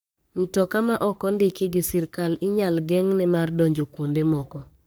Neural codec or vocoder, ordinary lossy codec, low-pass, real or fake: codec, 44.1 kHz, 3.4 kbps, Pupu-Codec; none; none; fake